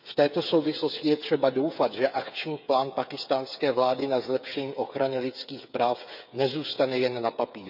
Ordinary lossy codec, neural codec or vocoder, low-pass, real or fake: none; codec, 16 kHz, 8 kbps, FreqCodec, smaller model; 5.4 kHz; fake